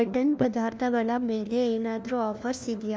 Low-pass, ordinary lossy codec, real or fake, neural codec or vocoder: none; none; fake; codec, 16 kHz, 1 kbps, FunCodec, trained on LibriTTS, 50 frames a second